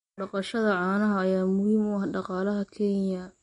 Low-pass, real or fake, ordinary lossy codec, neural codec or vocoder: 10.8 kHz; real; MP3, 48 kbps; none